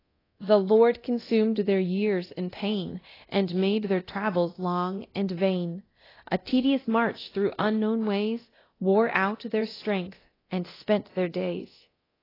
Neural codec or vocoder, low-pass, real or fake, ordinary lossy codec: codec, 24 kHz, 0.9 kbps, DualCodec; 5.4 kHz; fake; AAC, 24 kbps